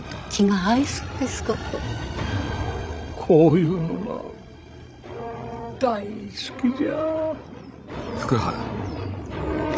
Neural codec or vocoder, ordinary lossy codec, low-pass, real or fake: codec, 16 kHz, 16 kbps, FreqCodec, larger model; none; none; fake